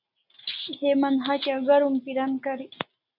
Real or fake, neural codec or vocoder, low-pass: real; none; 5.4 kHz